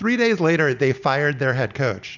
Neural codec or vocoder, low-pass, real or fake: none; 7.2 kHz; real